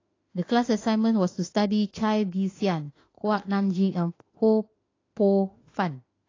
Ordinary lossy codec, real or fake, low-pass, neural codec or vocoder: AAC, 32 kbps; fake; 7.2 kHz; autoencoder, 48 kHz, 32 numbers a frame, DAC-VAE, trained on Japanese speech